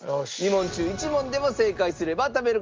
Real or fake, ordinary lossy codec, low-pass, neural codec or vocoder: real; Opus, 32 kbps; 7.2 kHz; none